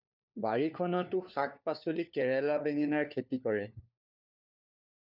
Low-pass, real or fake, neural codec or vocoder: 5.4 kHz; fake; codec, 16 kHz, 4 kbps, FunCodec, trained on LibriTTS, 50 frames a second